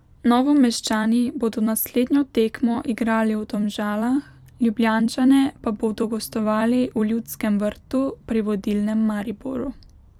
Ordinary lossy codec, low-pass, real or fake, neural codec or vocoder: none; 19.8 kHz; fake; vocoder, 44.1 kHz, 128 mel bands every 256 samples, BigVGAN v2